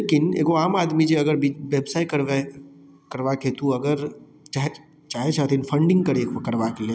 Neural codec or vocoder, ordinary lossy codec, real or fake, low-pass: none; none; real; none